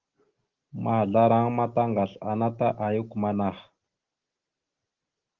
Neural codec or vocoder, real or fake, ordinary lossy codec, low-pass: none; real; Opus, 16 kbps; 7.2 kHz